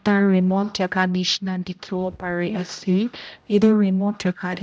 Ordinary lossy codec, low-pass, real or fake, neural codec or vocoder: none; none; fake; codec, 16 kHz, 0.5 kbps, X-Codec, HuBERT features, trained on general audio